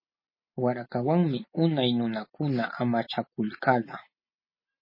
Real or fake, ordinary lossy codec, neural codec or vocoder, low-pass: real; MP3, 24 kbps; none; 5.4 kHz